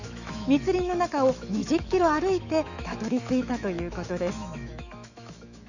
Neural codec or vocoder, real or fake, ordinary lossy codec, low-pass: autoencoder, 48 kHz, 128 numbers a frame, DAC-VAE, trained on Japanese speech; fake; none; 7.2 kHz